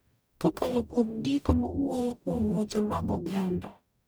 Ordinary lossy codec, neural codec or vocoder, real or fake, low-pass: none; codec, 44.1 kHz, 0.9 kbps, DAC; fake; none